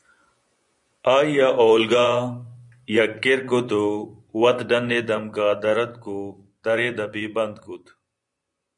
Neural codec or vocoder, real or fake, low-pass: vocoder, 24 kHz, 100 mel bands, Vocos; fake; 10.8 kHz